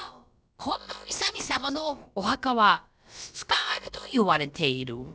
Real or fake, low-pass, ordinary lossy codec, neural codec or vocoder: fake; none; none; codec, 16 kHz, about 1 kbps, DyCAST, with the encoder's durations